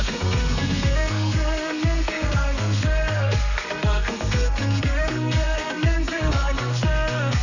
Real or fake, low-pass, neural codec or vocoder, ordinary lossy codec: fake; 7.2 kHz; codec, 32 kHz, 1.9 kbps, SNAC; none